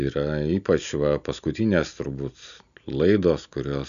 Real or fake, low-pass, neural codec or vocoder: real; 7.2 kHz; none